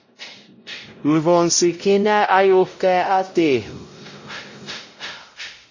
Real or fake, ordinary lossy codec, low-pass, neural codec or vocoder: fake; MP3, 32 kbps; 7.2 kHz; codec, 16 kHz, 0.5 kbps, X-Codec, WavLM features, trained on Multilingual LibriSpeech